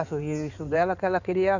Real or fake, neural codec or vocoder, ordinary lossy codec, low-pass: fake; codec, 16 kHz in and 24 kHz out, 2.2 kbps, FireRedTTS-2 codec; none; 7.2 kHz